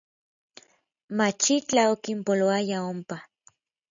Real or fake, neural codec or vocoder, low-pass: real; none; 7.2 kHz